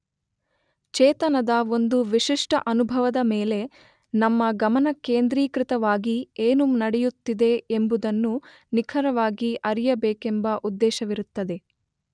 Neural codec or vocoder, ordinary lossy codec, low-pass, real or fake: none; none; 9.9 kHz; real